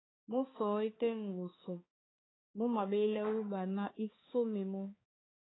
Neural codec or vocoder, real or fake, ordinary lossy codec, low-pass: codec, 16 kHz, 4 kbps, X-Codec, HuBERT features, trained on balanced general audio; fake; AAC, 16 kbps; 7.2 kHz